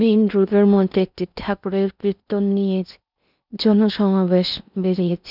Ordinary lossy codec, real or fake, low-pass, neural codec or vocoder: none; fake; 5.4 kHz; codec, 16 kHz in and 24 kHz out, 0.6 kbps, FocalCodec, streaming, 4096 codes